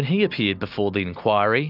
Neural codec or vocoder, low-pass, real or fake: none; 5.4 kHz; real